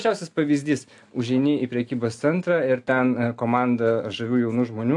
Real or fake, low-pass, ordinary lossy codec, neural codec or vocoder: real; 10.8 kHz; AAC, 64 kbps; none